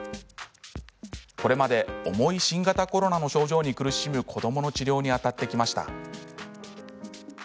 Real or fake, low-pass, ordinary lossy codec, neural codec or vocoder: real; none; none; none